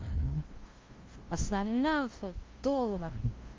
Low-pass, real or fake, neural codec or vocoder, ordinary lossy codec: 7.2 kHz; fake; codec, 16 kHz, 0.5 kbps, FunCodec, trained on LibriTTS, 25 frames a second; Opus, 32 kbps